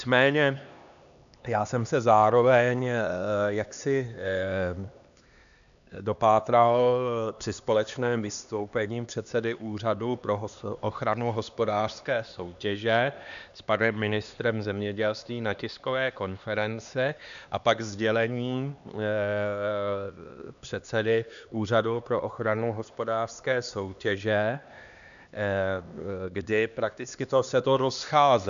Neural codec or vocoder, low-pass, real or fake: codec, 16 kHz, 2 kbps, X-Codec, HuBERT features, trained on LibriSpeech; 7.2 kHz; fake